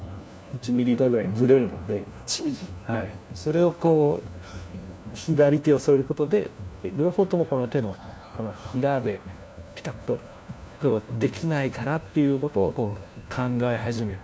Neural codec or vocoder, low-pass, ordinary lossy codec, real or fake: codec, 16 kHz, 1 kbps, FunCodec, trained on LibriTTS, 50 frames a second; none; none; fake